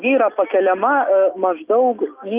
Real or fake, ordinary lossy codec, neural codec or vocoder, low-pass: real; Opus, 32 kbps; none; 3.6 kHz